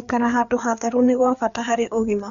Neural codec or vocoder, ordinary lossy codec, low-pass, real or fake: codec, 16 kHz, 4 kbps, FreqCodec, larger model; none; 7.2 kHz; fake